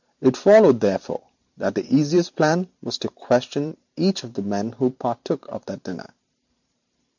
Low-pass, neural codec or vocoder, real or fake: 7.2 kHz; vocoder, 44.1 kHz, 128 mel bands every 512 samples, BigVGAN v2; fake